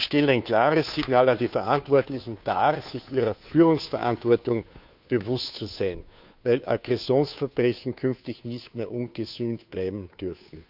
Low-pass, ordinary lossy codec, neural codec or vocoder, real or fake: 5.4 kHz; none; codec, 16 kHz, 2 kbps, FunCodec, trained on Chinese and English, 25 frames a second; fake